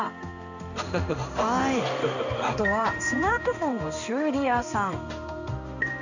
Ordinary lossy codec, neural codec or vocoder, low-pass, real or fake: none; codec, 16 kHz in and 24 kHz out, 1 kbps, XY-Tokenizer; 7.2 kHz; fake